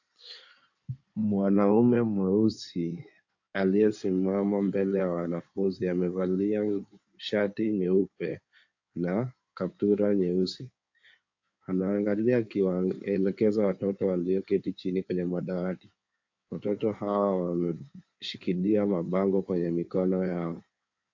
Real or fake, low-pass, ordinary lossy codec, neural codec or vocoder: fake; 7.2 kHz; AAC, 48 kbps; codec, 16 kHz in and 24 kHz out, 2.2 kbps, FireRedTTS-2 codec